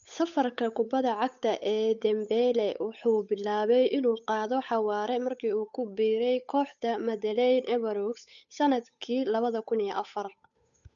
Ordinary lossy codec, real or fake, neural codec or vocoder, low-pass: none; fake; codec, 16 kHz, 8 kbps, FunCodec, trained on Chinese and English, 25 frames a second; 7.2 kHz